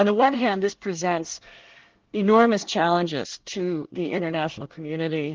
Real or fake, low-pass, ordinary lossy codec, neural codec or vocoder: fake; 7.2 kHz; Opus, 16 kbps; codec, 24 kHz, 1 kbps, SNAC